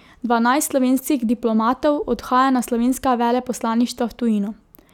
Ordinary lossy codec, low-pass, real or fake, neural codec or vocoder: none; 19.8 kHz; real; none